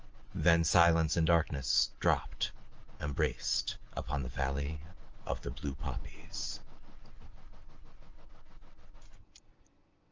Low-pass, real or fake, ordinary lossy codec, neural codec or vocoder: 7.2 kHz; real; Opus, 24 kbps; none